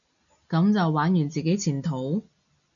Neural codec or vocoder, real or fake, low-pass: none; real; 7.2 kHz